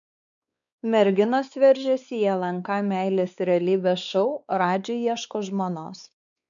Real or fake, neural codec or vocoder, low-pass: fake; codec, 16 kHz, 4 kbps, X-Codec, WavLM features, trained on Multilingual LibriSpeech; 7.2 kHz